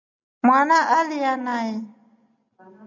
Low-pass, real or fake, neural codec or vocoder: 7.2 kHz; real; none